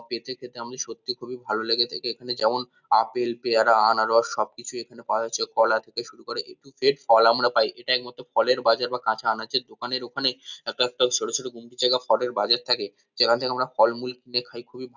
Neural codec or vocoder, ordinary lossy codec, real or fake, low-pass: none; none; real; 7.2 kHz